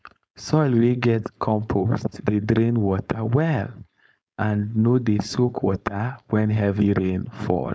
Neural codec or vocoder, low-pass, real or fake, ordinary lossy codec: codec, 16 kHz, 4.8 kbps, FACodec; none; fake; none